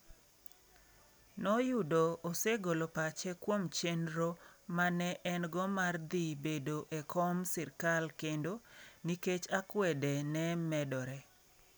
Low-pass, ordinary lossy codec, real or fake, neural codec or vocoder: none; none; real; none